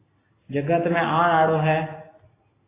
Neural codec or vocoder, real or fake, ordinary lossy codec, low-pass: none; real; AAC, 16 kbps; 3.6 kHz